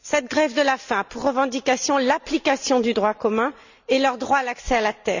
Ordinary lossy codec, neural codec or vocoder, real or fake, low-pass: none; none; real; 7.2 kHz